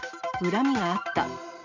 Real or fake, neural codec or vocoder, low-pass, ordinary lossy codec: real; none; 7.2 kHz; none